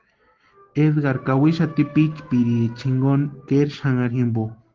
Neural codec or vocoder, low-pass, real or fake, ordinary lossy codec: none; 7.2 kHz; real; Opus, 16 kbps